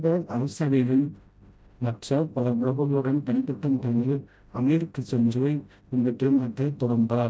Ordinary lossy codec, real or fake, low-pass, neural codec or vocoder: none; fake; none; codec, 16 kHz, 0.5 kbps, FreqCodec, smaller model